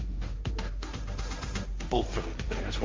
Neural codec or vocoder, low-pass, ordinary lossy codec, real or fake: codec, 16 kHz, 1.1 kbps, Voila-Tokenizer; 7.2 kHz; Opus, 32 kbps; fake